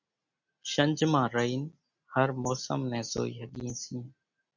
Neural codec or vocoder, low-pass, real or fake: none; 7.2 kHz; real